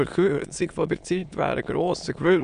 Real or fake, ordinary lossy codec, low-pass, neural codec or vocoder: fake; none; 9.9 kHz; autoencoder, 22.05 kHz, a latent of 192 numbers a frame, VITS, trained on many speakers